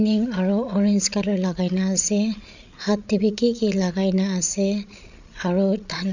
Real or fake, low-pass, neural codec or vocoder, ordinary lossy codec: fake; 7.2 kHz; codec, 16 kHz, 8 kbps, FreqCodec, larger model; none